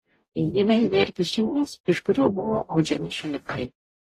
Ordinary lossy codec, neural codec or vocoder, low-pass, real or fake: AAC, 48 kbps; codec, 44.1 kHz, 0.9 kbps, DAC; 14.4 kHz; fake